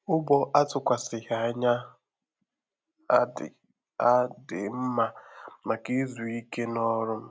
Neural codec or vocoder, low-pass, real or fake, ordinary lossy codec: none; none; real; none